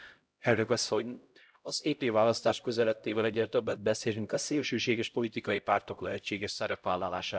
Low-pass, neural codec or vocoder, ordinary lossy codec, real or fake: none; codec, 16 kHz, 0.5 kbps, X-Codec, HuBERT features, trained on LibriSpeech; none; fake